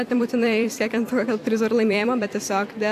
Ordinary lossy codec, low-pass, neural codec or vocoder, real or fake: AAC, 64 kbps; 14.4 kHz; vocoder, 44.1 kHz, 128 mel bands every 256 samples, BigVGAN v2; fake